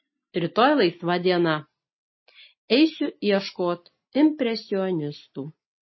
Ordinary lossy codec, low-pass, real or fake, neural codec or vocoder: MP3, 24 kbps; 7.2 kHz; real; none